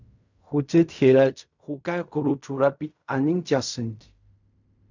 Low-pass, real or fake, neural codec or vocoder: 7.2 kHz; fake; codec, 16 kHz in and 24 kHz out, 0.4 kbps, LongCat-Audio-Codec, fine tuned four codebook decoder